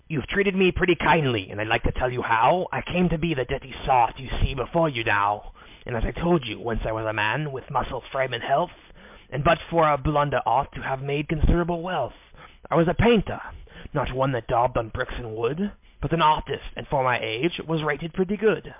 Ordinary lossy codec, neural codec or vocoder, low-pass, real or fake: MP3, 32 kbps; none; 3.6 kHz; real